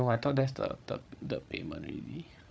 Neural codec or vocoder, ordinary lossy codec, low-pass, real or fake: codec, 16 kHz, 16 kbps, FreqCodec, larger model; none; none; fake